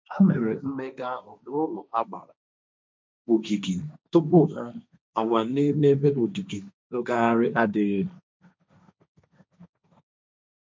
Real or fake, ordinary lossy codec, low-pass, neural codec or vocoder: fake; none; none; codec, 16 kHz, 1.1 kbps, Voila-Tokenizer